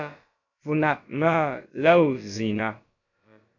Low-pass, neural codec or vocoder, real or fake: 7.2 kHz; codec, 16 kHz, about 1 kbps, DyCAST, with the encoder's durations; fake